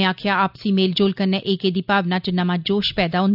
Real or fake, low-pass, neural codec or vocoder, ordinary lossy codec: real; 5.4 kHz; none; none